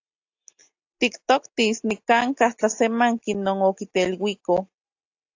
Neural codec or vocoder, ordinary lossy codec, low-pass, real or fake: none; AAC, 48 kbps; 7.2 kHz; real